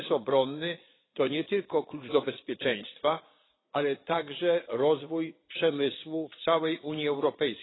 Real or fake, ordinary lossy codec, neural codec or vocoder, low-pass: fake; AAC, 16 kbps; vocoder, 22.05 kHz, 80 mel bands, Vocos; 7.2 kHz